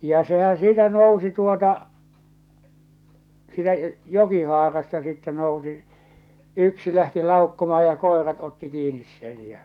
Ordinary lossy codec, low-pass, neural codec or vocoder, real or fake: none; 19.8 kHz; codec, 44.1 kHz, 7.8 kbps, DAC; fake